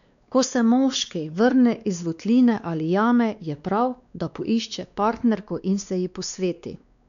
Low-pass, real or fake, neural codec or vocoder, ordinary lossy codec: 7.2 kHz; fake; codec, 16 kHz, 2 kbps, X-Codec, WavLM features, trained on Multilingual LibriSpeech; none